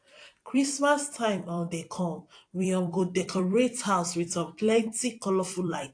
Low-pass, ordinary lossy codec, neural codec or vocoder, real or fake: 9.9 kHz; AAC, 48 kbps; vocoder, 44.1 kHz, 128 mel bands every 256 samples, BigVGAN v2; fake